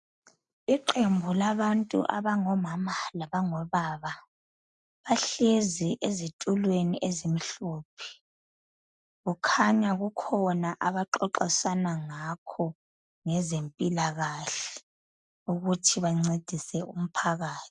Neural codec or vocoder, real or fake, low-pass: none; real; 10.8 kHz